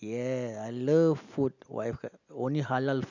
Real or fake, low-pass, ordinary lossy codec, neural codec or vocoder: real; 7.2 kHz; none; none